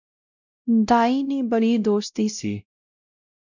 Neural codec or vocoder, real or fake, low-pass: codec, 16 kHz, 0.5 kbps, X-Codec, WavLM features, trained on Multilingual LibriSpeech; fake; 7.2 kHz